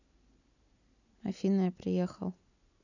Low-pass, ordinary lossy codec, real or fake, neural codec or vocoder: 7.2 kHz; none; real; none